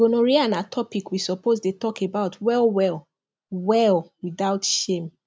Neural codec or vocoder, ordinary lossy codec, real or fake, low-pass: none; none; real; none